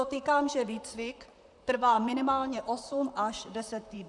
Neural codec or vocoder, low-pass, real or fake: vocoder, 44.1 kHz, 128 mel bands, Pupu-Vocoder; 10.8 kHz; fake